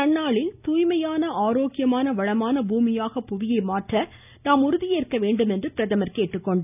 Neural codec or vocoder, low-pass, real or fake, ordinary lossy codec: none; 3.6 kHz; real; none